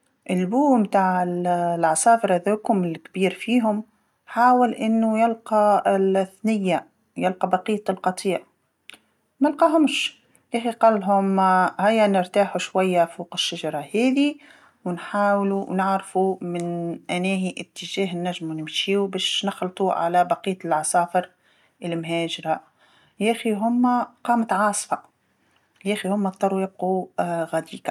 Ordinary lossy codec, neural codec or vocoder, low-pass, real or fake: none; none; 19.8 kHz; real